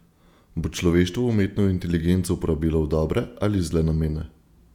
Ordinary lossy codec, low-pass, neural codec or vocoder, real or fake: none; 19.8 kHz; vocoder, 48 kHz, 128 mel bands, Vocos; fake